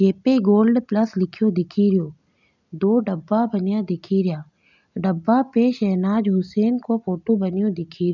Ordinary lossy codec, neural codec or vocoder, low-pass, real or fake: none; none; 7.2 kHz; real